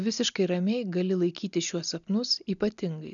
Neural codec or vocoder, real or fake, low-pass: none; real; 7.2 kHz